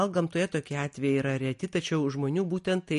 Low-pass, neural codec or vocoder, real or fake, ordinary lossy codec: 14.4 kHz; none; real; MP3, 48 kbps